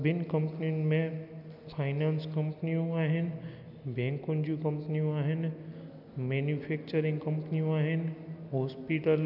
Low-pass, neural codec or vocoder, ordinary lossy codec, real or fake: 5.4 kHz; none; none; real